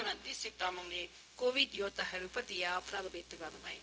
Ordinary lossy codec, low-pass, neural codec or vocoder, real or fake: none; none; codec, 16 kHz, 0.4 kbps, LongCat-Audio-Codec; fake